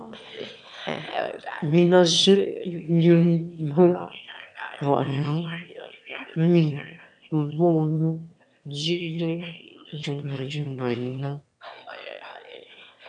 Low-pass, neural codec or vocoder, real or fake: 9.9 kHz; autoencoder, 22.05 kHz, a latent of 192 numbers a frame, VITS, trained on one speaker; fake